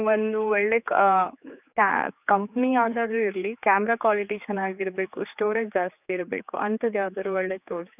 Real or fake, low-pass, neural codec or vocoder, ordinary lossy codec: fake; 3.6 kHz; codec, 16 kHz, 4 kbps, X-Codec, HuBERT features, trained on general audio; none